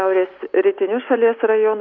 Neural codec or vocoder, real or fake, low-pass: none; real; 7.2 kHz